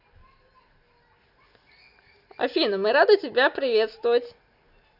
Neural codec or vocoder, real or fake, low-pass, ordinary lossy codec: none; real; 5.4 kHz; none